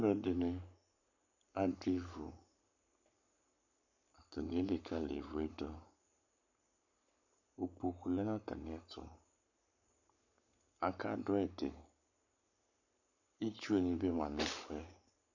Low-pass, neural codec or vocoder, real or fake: 7.2 kHz; codec, 44.1 kHz, 7.8 kbps, Pupu-Codec; fake